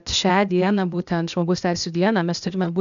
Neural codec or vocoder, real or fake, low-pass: codec, 16 kHz, 0.8 kbps, ZipCodec; fake; 7.2 kHz